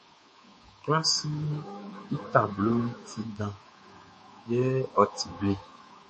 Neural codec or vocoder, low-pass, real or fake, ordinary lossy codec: codec, 24 kHz, 3.1 kbps, DualCodec; 10.8 kHz; fake; MP3, 32 kbps